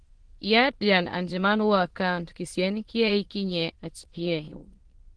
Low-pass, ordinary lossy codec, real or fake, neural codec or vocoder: 9.9 kHz; Opus, 16 kbps; fake; autoencoder, 22.05 kHz, a latent of 192 numbers a frame, VITS, trained on many speakers